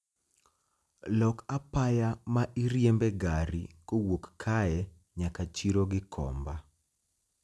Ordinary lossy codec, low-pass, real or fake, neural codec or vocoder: none; none; real; none